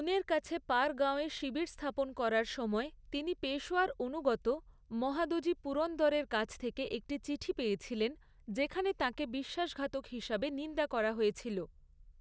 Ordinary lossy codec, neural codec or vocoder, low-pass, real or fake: none; none; none; real